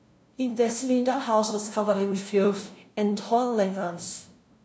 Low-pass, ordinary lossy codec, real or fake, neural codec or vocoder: none; none; fake; codec, 16 kHz, 0.5 kbps, FunCodec, trained on LibriTTS, 25 frames a second